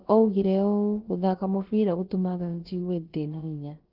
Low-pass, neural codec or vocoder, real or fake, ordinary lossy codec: 5.4 kHz; codec, 16 kHz, about 1 kbps, DyCAST, with the encoder's durations; fake; Opus, 16 kbps